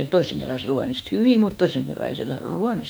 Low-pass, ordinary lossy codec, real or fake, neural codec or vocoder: none; none; fake; autoencoder, 48 kHz, 32 numbers a frame, DAC-VAE, trained on Japanese speech